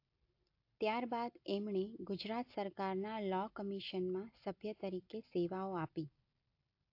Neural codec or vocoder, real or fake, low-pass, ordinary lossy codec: none; real; 5.4 kHz; AAC, 48 kbps